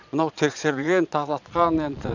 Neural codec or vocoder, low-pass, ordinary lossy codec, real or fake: vocoder, 22.05 kHz, 80 mel bands, Vocos; 7.2 kHz; none; fake